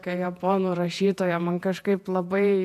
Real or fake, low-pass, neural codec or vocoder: fake; 14.4 kHz; vocoder, 48 kHz, 128 mel bands, Vocos